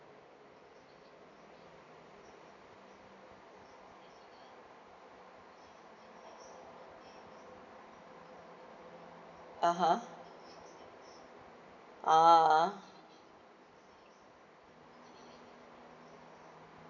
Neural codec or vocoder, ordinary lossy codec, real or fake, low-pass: none; none; real; 7.2 kHz